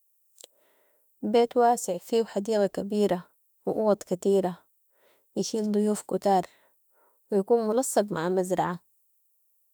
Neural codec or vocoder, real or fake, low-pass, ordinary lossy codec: autoencoder, 48 kHz, 32 numbers a frame, DAC-VAE, trained on Japanese speech; fake; none; none